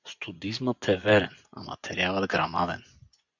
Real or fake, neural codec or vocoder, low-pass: fake; vocoder, 24 kHz, 100 mel bands, Vocos; 7.2 kHz